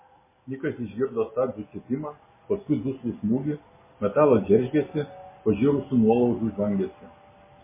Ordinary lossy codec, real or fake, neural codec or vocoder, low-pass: MP3, 16 kbps; real; none; 3.6 kHz